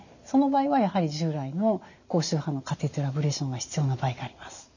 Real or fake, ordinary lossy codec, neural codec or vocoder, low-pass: real; none; none; 7.2 kHz